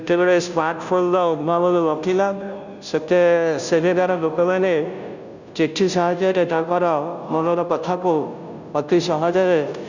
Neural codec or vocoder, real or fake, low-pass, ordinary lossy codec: codec, 16 kHz, 0.5 kbps, FunCodec, trained on Chinese and English, 25 frames a second; fake; 7.2 kHz; none